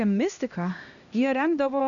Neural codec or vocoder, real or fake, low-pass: codec, 16 kHz, 1 kbps, X-Codec, HuBERT features, trained on LibriSpeech; fake; 7.2 kHz